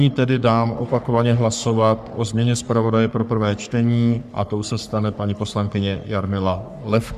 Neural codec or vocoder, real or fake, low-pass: codec, 44.1 kHz, 3.4 kbps, Pupu-Codec; fake; 14.4 kHz